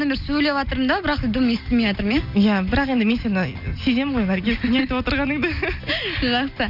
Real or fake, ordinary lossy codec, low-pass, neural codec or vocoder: real; none; 5.4 kHz; none